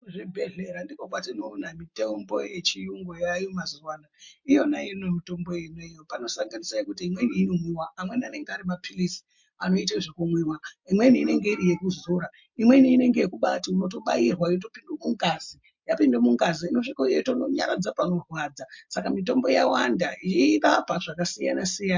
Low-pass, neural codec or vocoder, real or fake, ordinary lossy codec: 7.2 kHz; none; real; MP3, 48 kbps